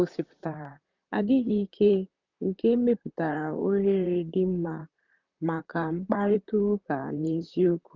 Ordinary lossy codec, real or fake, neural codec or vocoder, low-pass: none; fake; vocoder, 44.1 kHz, 128 mel bands, Pupu-Vocoder; 7.2 kHz